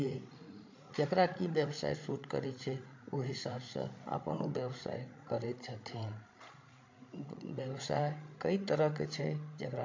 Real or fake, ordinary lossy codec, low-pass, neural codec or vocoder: fake; MP3, 48 kbps; 7.2 kHz; codec, 16 kHz, 16 kbps, FreqCodec, larger model